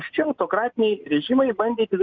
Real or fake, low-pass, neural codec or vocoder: real; 7.2 kHz; none